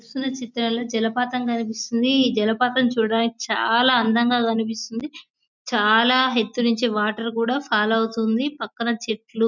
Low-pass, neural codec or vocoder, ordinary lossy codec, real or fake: 7.2 kHz; none; none; real